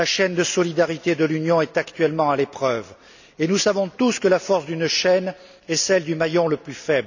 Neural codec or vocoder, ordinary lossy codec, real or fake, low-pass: none; none; real; 7.2 kHz